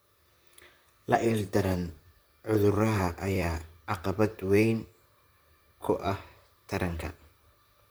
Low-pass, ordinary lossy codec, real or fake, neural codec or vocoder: none; none; fake; vocoder, 44.1 kHz, 128 mel bands, Pupu-Vocoder